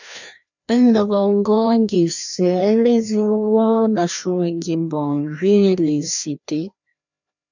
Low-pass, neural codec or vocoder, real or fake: 7.2 kHz; codec, 16 kHz, 1 kbps, FreqCodec, larger model; fake